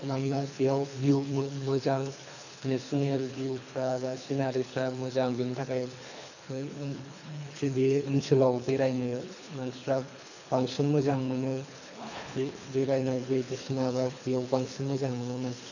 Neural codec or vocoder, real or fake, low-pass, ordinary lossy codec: codec, 24 kHz, 3 kbps, HILCodec; fake; 7.2 kHz; none